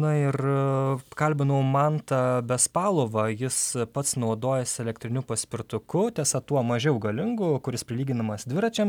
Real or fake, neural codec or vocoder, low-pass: real; none; 19.8 kHz